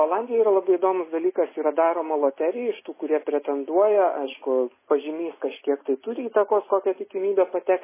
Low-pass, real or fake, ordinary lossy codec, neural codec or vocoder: 3.6 kHz; real; MP3, 16 kbps; none